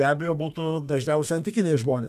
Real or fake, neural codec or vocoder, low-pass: fake; codec, 32 kHz, 1.9 kbps, SNAC; 14.4 kHz